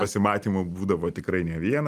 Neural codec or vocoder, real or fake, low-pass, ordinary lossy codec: none; real; 14.4 kHz; Opus, 32 kbps